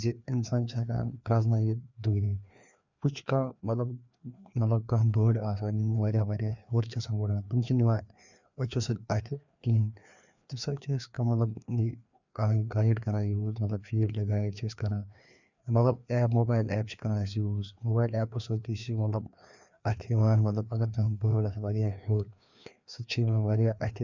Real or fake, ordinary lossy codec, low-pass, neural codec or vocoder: fake; none; 7.2 kHz; codec, 16 kHz, 2 kbps, FreqCodec, larger model